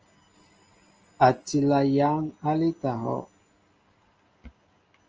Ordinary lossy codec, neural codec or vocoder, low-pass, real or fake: Opus, 24 kbps; none; 7.2 kHz; real